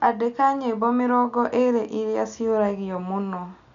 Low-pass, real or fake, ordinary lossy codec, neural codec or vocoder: 7.2 kHz; real; none; none